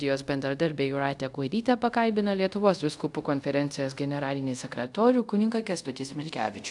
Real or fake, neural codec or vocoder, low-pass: fake; codec, 24 kHz, 0.5 kbps, DualCodec; 10.8 kHz